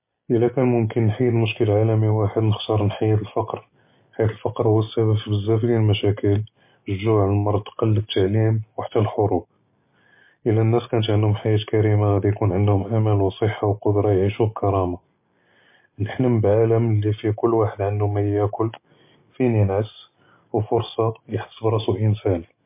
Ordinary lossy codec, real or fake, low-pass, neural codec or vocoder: MP3, 24 kbps; real; 3.6 kHz; none